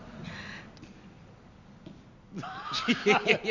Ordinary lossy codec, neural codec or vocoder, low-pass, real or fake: none; none; 7.2 kHz; real